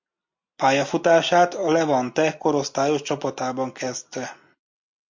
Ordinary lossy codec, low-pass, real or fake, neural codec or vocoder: MP3, 48 kbps; 7.2 kHz; real; none